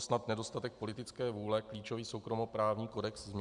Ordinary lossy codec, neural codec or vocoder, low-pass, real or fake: Opus, 64 kbps; none; 10.8 kHz; real